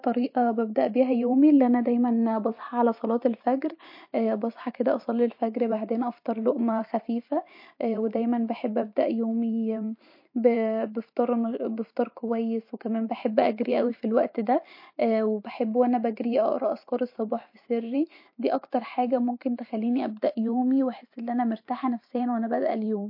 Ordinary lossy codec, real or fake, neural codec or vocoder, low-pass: MP3, 32 kbps; fake; vocoder, 44.1 kHz, 128 mel bands every 512 samples, BigVGAN v2; 5.4 kHz